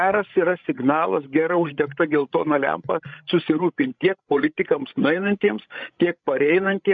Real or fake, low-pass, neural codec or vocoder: fake; 7.2 kHz; codec, 16 kHz, 16 kbps, FreqCodec, larger model